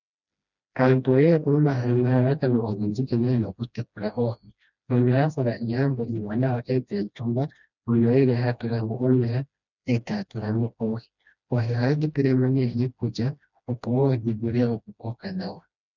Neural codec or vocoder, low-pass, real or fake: codec, 16 kHz, 1 kbps, FreqCodec, smaller model; 7.2 kHz; fake